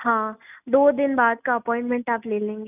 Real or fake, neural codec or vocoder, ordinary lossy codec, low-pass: real; none; none; 3.6 kHz